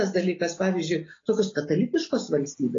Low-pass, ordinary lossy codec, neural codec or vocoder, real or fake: 7.2 kHz; AAC, 32 kbps; none; real